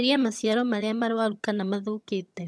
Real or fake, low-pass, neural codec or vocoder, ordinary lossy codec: fake; none; vocoder, 22.05 kHz, 80 mel bands, HiFi-GAN; none